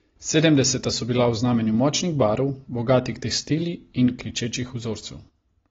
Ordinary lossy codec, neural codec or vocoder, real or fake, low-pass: AAC, 24 kbps; none; real; 7.2 kHz